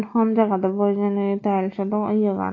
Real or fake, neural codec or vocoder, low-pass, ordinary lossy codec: real; none; 7.2 kHz; AAC, 32 kbps